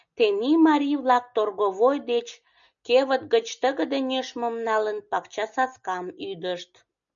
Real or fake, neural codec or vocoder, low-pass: real; none; 7.2 kHz